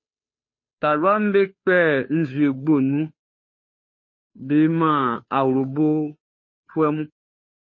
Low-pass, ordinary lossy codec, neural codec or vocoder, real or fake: 7.2 kHz; MP3, 32 kbps; codec, 16 kHz, 2 kbps, FunCodec, trained on Chinese and English, 25 frames a second; fake